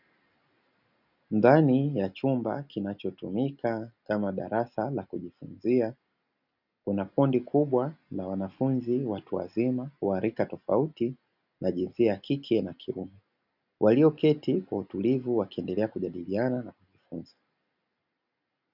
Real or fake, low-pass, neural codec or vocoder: real; 5.4 kHz; none